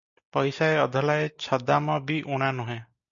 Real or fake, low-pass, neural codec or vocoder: real; 7.2 kHz; none